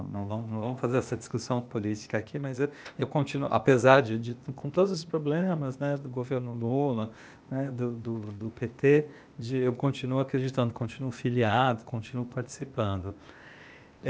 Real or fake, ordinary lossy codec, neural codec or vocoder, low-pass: fake; none; codec, 16 kHz, 0.8 kbps, ZipCodec; none